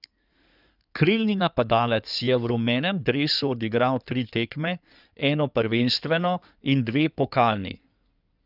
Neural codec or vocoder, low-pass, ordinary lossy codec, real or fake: codec, 16 kHz in and 24 kHz out, 2.2 kbps, FireRedTTS-2 codec; 5.4 kHz; none; fake